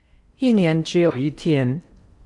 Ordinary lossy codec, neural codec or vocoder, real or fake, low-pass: Opus, 64 kbps; codec, 16 kHz in and 24 kHz out, 0.8 kbps, FocalCodec, streaming, 65536 codes; fake; 10.8 kHz